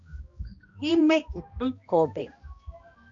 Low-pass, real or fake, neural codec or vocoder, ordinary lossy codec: 7.2 kHz; fake; codec, 16 kHz, 1 kbps, X-Codec, HuBERT features, trained on balanced general audio; MP3, 48 kbps